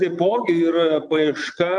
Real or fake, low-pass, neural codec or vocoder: fake; 9.9 kHz; vocoder, 22.05 kHz, 80 mel bands, Vocos